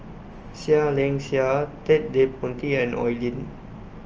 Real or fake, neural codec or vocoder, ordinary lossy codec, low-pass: real; none; Opus, 24 kbps; 7.2 kHz